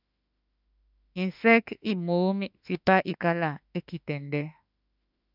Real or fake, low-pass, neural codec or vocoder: fake; 5.4 kHz; autoencoder, 48 kHz, 32 numbers a frame, DAC-VAE, trained on Japanese speech